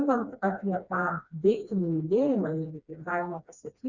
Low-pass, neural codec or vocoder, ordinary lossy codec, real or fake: 7.2 kHz; codec, 16 kHz, 2 kbps, FreqCodec, smaller model; Opus, 64 kbps; fake